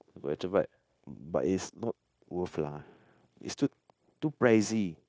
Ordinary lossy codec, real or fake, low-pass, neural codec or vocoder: none; fake; none; codec, 16 kHz, 0.9 kbps, LongCat-Audio-Codec